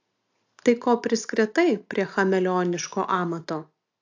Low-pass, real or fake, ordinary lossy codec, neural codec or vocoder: 7.2 kHz; real; AAC, 48 kbps; none